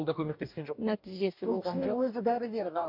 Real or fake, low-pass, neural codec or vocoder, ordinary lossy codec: fake; 5.4 kHz; codec, 44.1 kHz, 2.6 kbps, DAC; MP3, 48 kbps